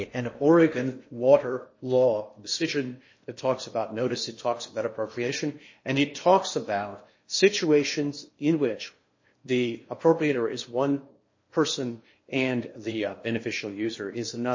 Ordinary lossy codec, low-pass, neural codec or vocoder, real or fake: MP3, 32 kbps; 7.2 kHz; codec, 16 kHz in and 24 kHz out, 0.8 kbps, FocalCodec, streaming, 65536 codes; fake